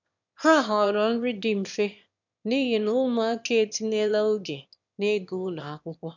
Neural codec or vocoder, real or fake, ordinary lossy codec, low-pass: autoencoder, 22.05 kHz, a latent of 192 numbers a frame, VITS, trained on one speaker; fake; none; 7.2 kHz